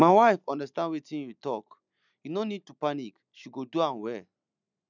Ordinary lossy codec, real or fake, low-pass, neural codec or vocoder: none; real; 7.2 kHz; none